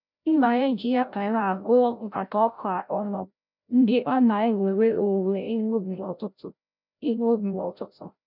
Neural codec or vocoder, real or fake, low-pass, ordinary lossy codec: codec, 16 kHz, 0.5 kbps, FreqCodec, larger model; fake; 5.4 kHz; none